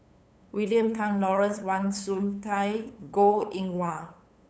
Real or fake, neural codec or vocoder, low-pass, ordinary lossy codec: fake; codec, 16 kHz, 8 kbps, FunCodec, trained on LibriTTS, 25 frames a second; none; none